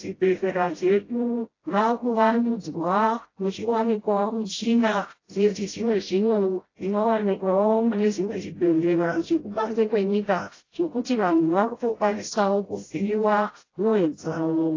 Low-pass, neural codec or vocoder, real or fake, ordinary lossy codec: 7.2 kHz; codec, 16 kHz, 0.5 kbps, FreqCodec, smaller model; fake; AAC, 32 kbps